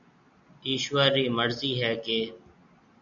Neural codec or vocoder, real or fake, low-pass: none; real; 7.2 kHz